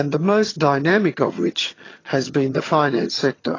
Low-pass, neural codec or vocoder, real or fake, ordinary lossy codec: 7.2 kHz; vocoder, 22.05 kHz, 80 mel bands, HiFi-GAN; fake; AAC, 32 kbps